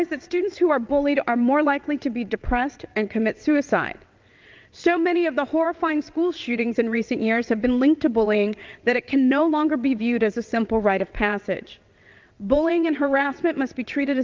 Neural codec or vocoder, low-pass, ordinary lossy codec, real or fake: vocoder, 22.05 kHz, 80 mel bands, WaveNeXt; 7.2 kHz; Opus, 24 kbps; fake